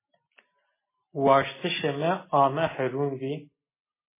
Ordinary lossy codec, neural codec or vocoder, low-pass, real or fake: MP3, 16 kbps; none; 3.6 kHz; real